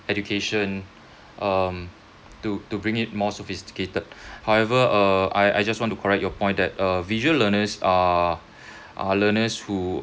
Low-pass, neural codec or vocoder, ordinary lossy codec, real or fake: none; none; none; real